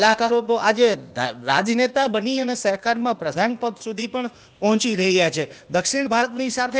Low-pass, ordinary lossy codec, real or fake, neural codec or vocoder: none; none; fake; codec, 16 kHz, 0.8 kbps, ZipCodec